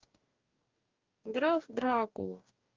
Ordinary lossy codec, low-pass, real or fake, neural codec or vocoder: Opus, 24 kbps; 7.2 kHz; fake; codec, 44.1 kHz, 2.6 kbps, DAC